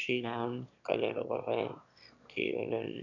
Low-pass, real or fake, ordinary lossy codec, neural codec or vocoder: 7.2 kHz; fake; none; autoencoder, 22.05 kHz, a latent of 192 numbers a frame, VITS, trained on one speaker